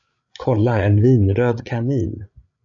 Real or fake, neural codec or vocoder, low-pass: fake; codec, 16 kHz, 8 kbps, FreqCodec, larger model; 7.2 kHz